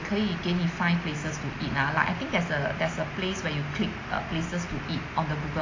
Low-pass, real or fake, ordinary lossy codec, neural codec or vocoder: 7.2 kHz; real; AAC, 32 kbps; none